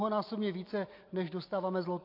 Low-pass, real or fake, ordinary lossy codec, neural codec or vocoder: 5.4 kHz; real; AAC, 32 kbps; none